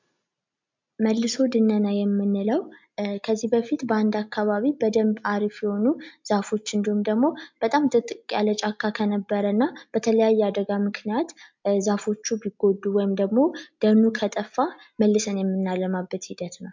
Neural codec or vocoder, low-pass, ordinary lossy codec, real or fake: none; 7.2 kHz; MP3, 64 kbps; real